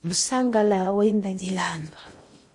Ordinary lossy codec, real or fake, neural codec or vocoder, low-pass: MP3, 48 kbps; fake; codec, 16 kHz in and 24 kHz out, 0.6 kbps, FocalCodec, streaming, 4096 codes; 10.8 kHz